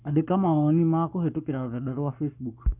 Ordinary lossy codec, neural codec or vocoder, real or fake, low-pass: none; codec, 44.1 kHz, 7.8 kbps, Pupu-Codec; fake; 3.6 kHz